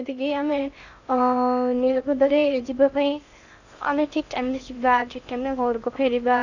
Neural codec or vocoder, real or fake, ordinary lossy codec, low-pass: codec, 16 kHz in and 24 kHz out, 0.6 kbps, FocalCodec, streaming, 2048 codes; fake; none; 7.2 kHz